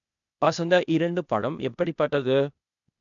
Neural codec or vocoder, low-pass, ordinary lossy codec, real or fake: codec, 16 kHz, 0.8 kbps, ZipCodec; 7.2 kHz; none; fake